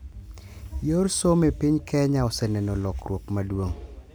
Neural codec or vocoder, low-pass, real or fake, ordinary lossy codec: none; none; real; none